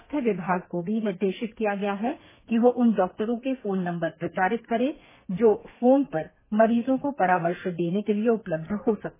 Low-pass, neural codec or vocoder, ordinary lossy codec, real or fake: 3.6 kHz; codec, 32 kHz, 1.9 kbps, SNAC; MP3, 16 kbps; fake